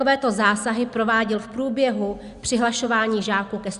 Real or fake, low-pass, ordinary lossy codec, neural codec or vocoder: real; 10.8 kHz; Opus, 64 kbps; none